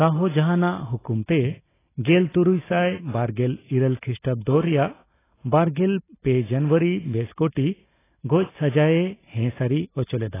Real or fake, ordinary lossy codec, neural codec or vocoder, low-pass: real; AAC, 16 kbps; none; 3.6 kHz